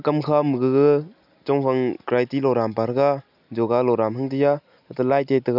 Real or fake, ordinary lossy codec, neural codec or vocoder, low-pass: real; none; none; 5.4 kHz